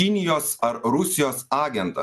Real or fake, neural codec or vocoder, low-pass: real; none; 14.4 kHz